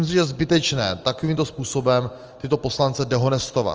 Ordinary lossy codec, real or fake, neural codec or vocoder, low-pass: Opus, 24 kbps; real; none; 7.2 kHz